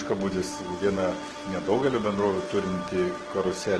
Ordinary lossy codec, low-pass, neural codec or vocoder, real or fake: Opus, 16 kbps; 10.8 kHz; none; real